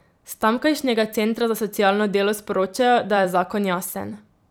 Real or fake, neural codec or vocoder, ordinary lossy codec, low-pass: fake; vocoder, 44.1 kHz, 128 mel bands every 256 samples, BigVGAN v2; none; none